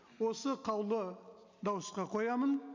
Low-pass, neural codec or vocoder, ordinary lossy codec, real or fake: 7.2 kHz; none; MP3, 64 kbps; real